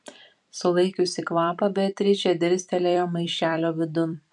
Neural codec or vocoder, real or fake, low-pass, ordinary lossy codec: vocoder, 44.1 kHz, 128 mel bands every 256 samples, BigVGAN v2; fake; 10.8 kHz; MP3, 64 kbps